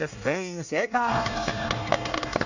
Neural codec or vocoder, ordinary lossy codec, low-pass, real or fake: codec, 24 kHz, 1 kbps, SNAC; none; 7.2 kHz; fake